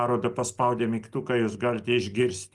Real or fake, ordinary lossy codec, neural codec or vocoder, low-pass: real; Opus, 32 kbps; none; 10.8 kHz